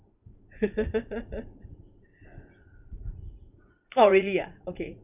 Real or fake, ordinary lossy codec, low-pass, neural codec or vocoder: fake; none; 3.6 kHz; vocoder, 44.1 kHz, 80 mel bands, Vocos